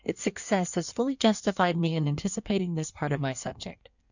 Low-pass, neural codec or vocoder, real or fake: 7.2 kHz; codec, 16 kHz in and 24 kHz out, 1.1 kbps, FireRedTTS-2 codec; fake